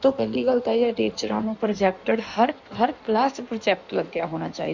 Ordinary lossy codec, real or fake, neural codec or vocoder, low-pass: none; fake; codec, 16 kHz in and 24 kHz out, 1.1 kbps, FireRedTTS-2 codec; 7.2 kHz